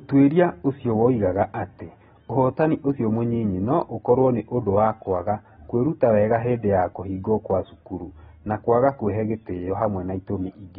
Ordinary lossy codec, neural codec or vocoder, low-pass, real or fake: AAC, 16 kbps; none; 14.4 kHz; real